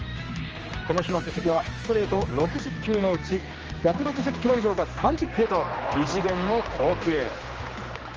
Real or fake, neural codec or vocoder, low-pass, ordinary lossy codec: fake; codec, 16 kHz, 2 kbps, X-Codec, HuBERT features, trained on general audio; 7.2 kHz; Opus, 24 kbps